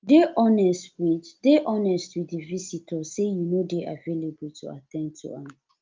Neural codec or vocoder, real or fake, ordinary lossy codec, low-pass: none; real; Opus, 24 kbps; 7.2 kHz